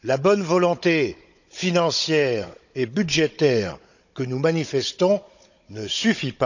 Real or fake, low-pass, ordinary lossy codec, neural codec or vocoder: fake; 7.2 kHz; none; codec, 16 kHz, 16 kbps, FunCodec, trained on Chinese and English, 50 frames a second